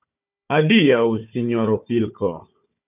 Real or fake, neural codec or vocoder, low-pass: fake; codec, 16 kHz, 4 kbps, FunCodec, trained on Chinese and English, 50 frames a second; 3.6 kHz